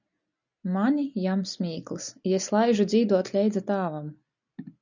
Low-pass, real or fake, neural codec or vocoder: 7.2 kHz; real; none